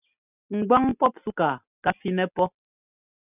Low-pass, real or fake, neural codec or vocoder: 3.6 kHz; real; none